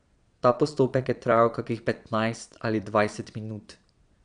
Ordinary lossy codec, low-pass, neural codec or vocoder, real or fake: none; 9.9 kHz; vocoder, 22.05 kHz, 80 mel bands, Vocos; fake